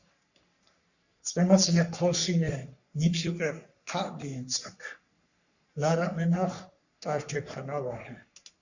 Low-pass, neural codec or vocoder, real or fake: 7.2 kHz; codec, 44.1 kHz, 3.4 kbps, Pupu-Codec; fake